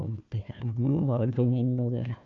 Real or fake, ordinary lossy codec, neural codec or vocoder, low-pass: fake; AAC, 48 kbps; codec, 16 kHz, 1 kbps, FunCodec, trained on Chinese and English, 50 frames a second; 7.2 kHz